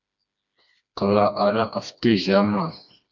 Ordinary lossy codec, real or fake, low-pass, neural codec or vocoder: MP3, 64 kbps; fake; 7.2 kHz; codec, 16 kHz, 2 kbps, FreqCodec, smaller model